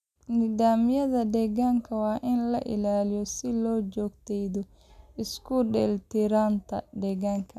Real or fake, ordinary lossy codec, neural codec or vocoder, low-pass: real; none; none; 14.4 kHz